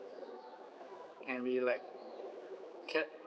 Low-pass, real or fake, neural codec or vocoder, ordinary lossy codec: none; fake; codec, 16 kHz, 4 kbps, X-Codec, HuBERT features, trained on general audio; none